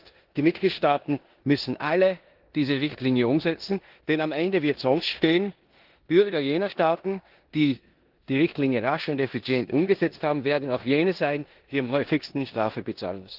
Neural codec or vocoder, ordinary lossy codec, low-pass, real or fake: codec, 16 kHz in and 24 kHz out, 0.9 kbps, LongCat-Audio-Codec, four codebook decoder; Opus, 16 kbps; 5.4 kHz; fake